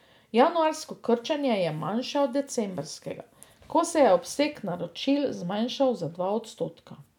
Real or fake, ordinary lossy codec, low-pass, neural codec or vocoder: real; none; 19.8 kHz; none